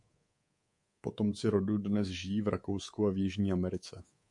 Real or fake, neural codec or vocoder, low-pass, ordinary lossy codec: fake; codec, 24 kHz, 3.1 kbps, DualCodec; 10.8 kHz; MP3, 64 kbps